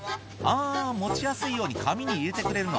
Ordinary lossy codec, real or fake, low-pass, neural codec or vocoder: none; real; none; none